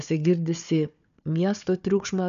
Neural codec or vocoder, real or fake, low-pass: codec, 16 kHz, 4 kbps, FunCodec, trained on Chinese and English, 50 frames a second; fake; 7.2 kHz